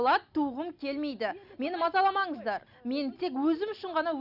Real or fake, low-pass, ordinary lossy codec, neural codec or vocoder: real; 5.4 kHz; none; none